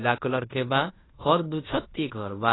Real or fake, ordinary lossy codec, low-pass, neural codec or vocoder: fake; AAC, 16 kbps; 7.2 kHz; codec, 16 kHz in and 24 kHz out, 0.9 kbps, LongCat-Audio-Codec, fine tuned four codebook decoder